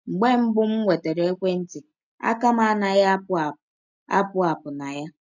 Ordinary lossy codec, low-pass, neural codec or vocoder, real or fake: none; 7.2 kHz; none; real